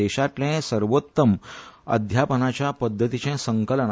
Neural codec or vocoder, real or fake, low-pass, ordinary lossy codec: none; real; none; none